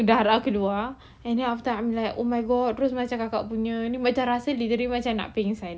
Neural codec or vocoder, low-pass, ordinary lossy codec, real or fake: none; none; none; real